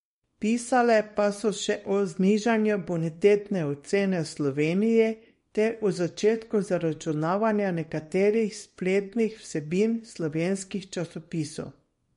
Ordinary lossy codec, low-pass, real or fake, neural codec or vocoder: MP3, 48 kbps; 10.8 kHz; fake; codec, 24 kHz, 0.9 kbps, WavTokenizer, small release